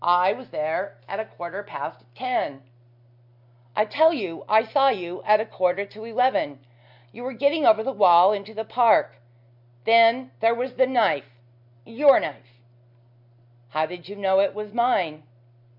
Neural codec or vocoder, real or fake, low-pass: none; real; 5.4 kHz